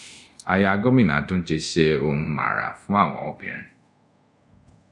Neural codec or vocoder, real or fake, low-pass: codec, 24 kHz, 0.5 kbps, DualCodec; fake; 10.8 kHz